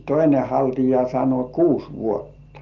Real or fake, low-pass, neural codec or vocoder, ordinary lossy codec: real; 7.2 kHz; none; Opus, 32 kbps